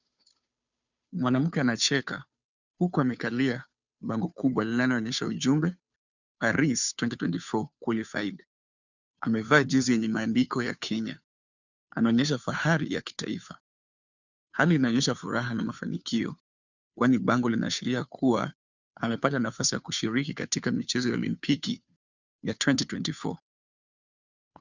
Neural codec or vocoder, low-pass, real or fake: codec, 16 kHz, 2 kbps, FunCodec, trained on Chinese and English, 25 frames a second; 7.2 kHz; fake